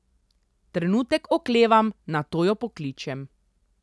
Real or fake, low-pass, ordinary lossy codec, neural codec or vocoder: real; none; none; none